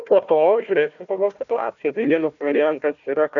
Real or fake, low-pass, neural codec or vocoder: fake; 7.2 kHz; codec, 16 kHz, 1 kbps, FunCodec, trained on Chinese and English, 50 frames a second